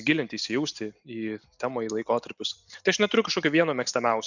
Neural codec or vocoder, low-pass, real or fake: none; 7.2 kHz; real